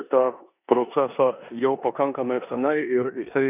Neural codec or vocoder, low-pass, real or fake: codec, 16 kHz in and 24 kHz out, 0.9 kbps, LongCat-Audio-Codec, four codebook decoder; 3.6 kHz; fake